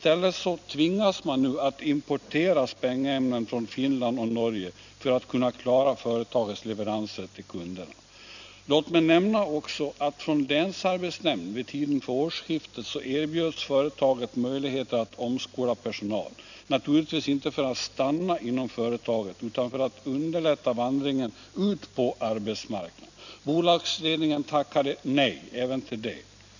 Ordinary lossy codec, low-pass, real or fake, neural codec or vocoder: none; 7.2 kHz; real; none